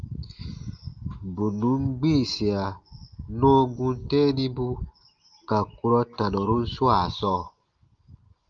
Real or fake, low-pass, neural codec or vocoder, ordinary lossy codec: real; 7.2 kHz; none; Opus, 32 kbps